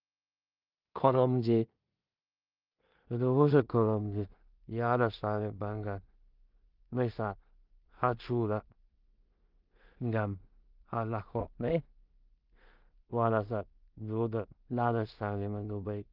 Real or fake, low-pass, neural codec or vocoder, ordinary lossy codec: fake; 5.4 kHz; codec, 16 kHz in and 24 kHz out, 0.4 kbps, LongCat-Audio-Codec, two codebook decoder; Opus, 24 kbps